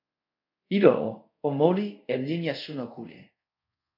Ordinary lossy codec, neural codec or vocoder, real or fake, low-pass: AAC, 48 kbps; codec, 24 kHz, 0.5 kbps, DualCodec; fake; 5.4 kHz